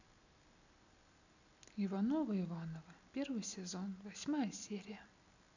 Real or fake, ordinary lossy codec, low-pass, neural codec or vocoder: real; none; 7.2 kHz; none